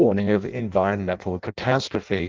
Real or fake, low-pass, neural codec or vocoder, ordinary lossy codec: fake; 7.2 kHz; codec, 16 kHz in and 24 kHz out, 0.6 kbps, FireRedTTS-2 codec; Opus, 24 kbps